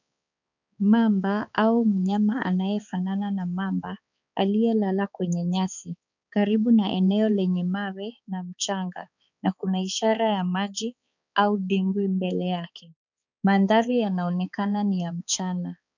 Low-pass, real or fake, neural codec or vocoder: 7.2 kHz; fake; codec, 16 kHz, 4 kbps, X-Codec, HuBERT features, trained on balanced general audio